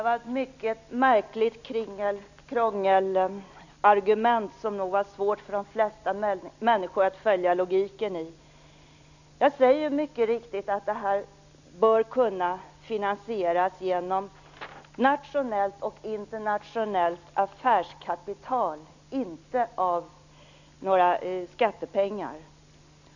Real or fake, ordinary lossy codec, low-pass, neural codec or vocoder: real; none; 7.2 kHz; none